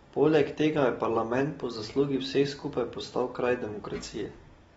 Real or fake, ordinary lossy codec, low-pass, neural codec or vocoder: real; AAC, 24 kbps; 19.8 kHz; none